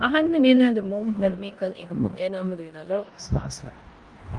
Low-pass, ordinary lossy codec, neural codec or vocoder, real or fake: 10.8 kHz; Opus, 32 kbps; codec, 16 kHz in and 24 kHz out, 0.9 kbps, LongCat-Audio-Codec, four codebook decoder; fake